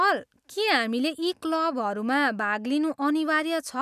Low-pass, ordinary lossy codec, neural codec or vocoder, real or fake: 14.4 kHz; none; none; real